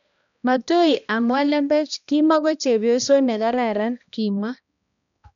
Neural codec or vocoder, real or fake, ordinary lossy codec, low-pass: codec, 16 kHz, 1 kbps, X-Codec, HuBERT features, trained on balanced general audio; fake; none; 7.2 kHz